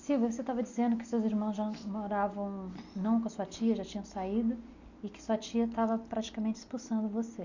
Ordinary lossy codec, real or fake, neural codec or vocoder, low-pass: none; real; none; 7.2 kHz